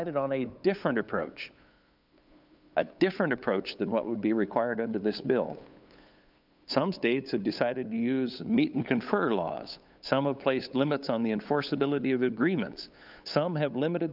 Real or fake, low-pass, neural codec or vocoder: fake; 5.4 kHz; codec, 16 kHz, 8 kbps, FunCodec, trained on LibriTTS, 25 frames a second